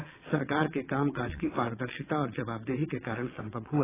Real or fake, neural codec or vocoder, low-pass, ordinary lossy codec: fake; vocoder, 22.05 kHz, 80 mel bands, WaveNeXt; 3.6 kHz; AAC, 16 kbps